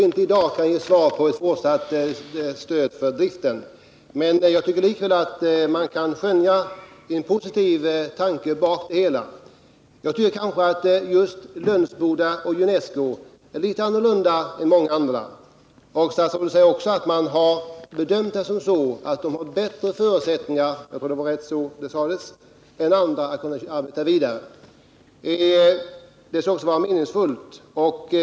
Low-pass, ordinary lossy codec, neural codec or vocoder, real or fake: none; none; none; real